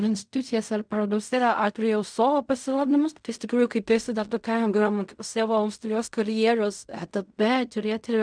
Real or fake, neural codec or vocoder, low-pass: fake; codec, 16 kHz in and 24 kHz out, 0.4 kbps, LongCat-Audio-Codec, fine tuned four codebook decoder; 9.9 kHz